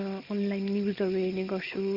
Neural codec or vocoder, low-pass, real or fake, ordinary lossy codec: none; 5.4 kHz; real; Opus, 32 kbps